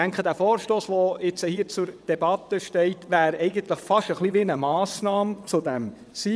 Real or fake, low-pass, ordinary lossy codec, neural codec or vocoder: fake; none; none; vocoder, 22.05 kHz, 80 mel bands, WaveNeXt